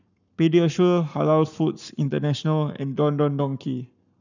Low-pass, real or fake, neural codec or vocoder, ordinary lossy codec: 7.2 kHz; fake; codec, 44.1 kHz, 7.8 kbps, Pupu-Codec; none